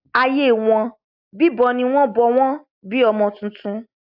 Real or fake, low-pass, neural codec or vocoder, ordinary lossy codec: real; 5.4 kHz; none; AAC, 48 kbps